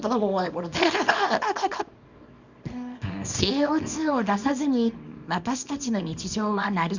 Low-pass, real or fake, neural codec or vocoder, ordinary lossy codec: 7.2 kHz; fake; codec, 24 kHz, 0.9 kbps, WavTokenizer, small release; Opus, 64 kbps